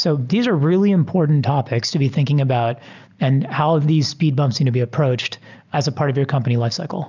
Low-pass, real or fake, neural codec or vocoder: 7.2 kHz; real; none